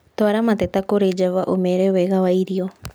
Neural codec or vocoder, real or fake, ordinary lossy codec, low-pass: none; real; none; none